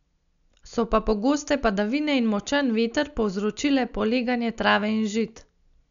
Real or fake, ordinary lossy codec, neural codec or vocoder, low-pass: real; none; none; 7.2 kHz